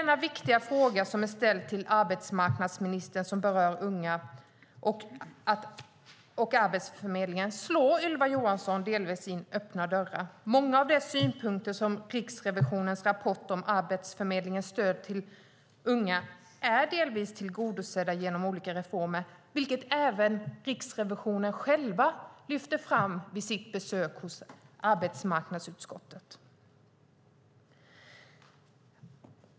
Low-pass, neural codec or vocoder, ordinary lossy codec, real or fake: none; none; none; real